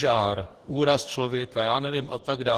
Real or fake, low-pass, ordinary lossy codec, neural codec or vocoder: fake; 14.4 kHz; Opus, 16 kbps; codec, 44.1 kHz, 2.6 kbps, DAC